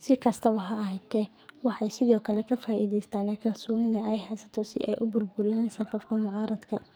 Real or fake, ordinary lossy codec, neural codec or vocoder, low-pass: fake; none; codec, 44.1 kHz, 2.6 kbps, SNAC; none